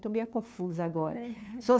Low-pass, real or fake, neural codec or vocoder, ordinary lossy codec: none; fake; codec, 16 kHz, 2 kbps, FunCodec, trained on LibriTTS, 25 frames a second; none